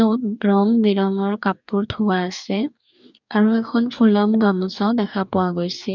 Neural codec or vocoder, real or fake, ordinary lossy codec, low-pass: codec, 44.1 kHz, 2.6 kbps, DAC; fake; none; 7.2 kHz